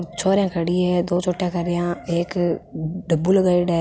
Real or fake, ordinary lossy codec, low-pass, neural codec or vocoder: real; none; none; none